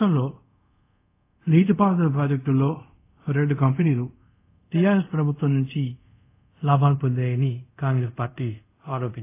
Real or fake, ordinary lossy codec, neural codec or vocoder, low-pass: fake; AAC, 24 kbps; codec, 24 kHz, 0.5 kbps, DualCodec; 3.6 kHz